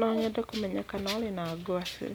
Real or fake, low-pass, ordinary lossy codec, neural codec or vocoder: real; none; none; none